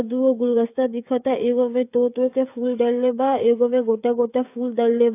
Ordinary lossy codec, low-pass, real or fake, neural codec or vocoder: AAC, 24 kbps; 3.6 kHz; fake; codec, 16 kHz, 16 kbps, FreqCodec, smaller model